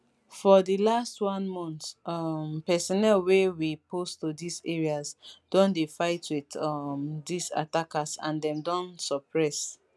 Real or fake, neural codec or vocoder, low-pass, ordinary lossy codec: real; none; none; none